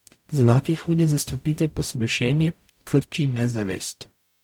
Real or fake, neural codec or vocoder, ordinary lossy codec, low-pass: fake; codec, 44.1 kHz, 0.9 kbps, DAC; none; 19.8 kHz